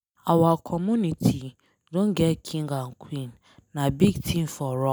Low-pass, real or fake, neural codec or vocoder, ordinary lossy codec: none; real; none; none